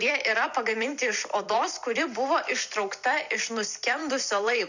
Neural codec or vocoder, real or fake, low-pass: vocoder, 44.1 kHz, 128 mel bands every 256 samples, BigVGAN v2; fake; 7.2 kHz